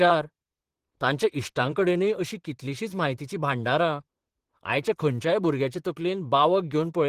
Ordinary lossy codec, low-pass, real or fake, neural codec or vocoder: Opus, 16 kbps; 14.4 kHz; fake; vocoder, 44.1 kHz, 128 mel bands, Pupu-Vocoder